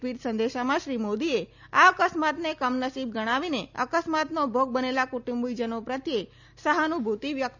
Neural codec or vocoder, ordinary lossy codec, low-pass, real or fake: none; none; 7.2 kHz; real